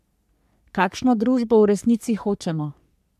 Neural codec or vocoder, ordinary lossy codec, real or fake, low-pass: codec, 44.1 kHz, 3.4 kbps, Pupu-Codec; none; fake; 14.4 kHz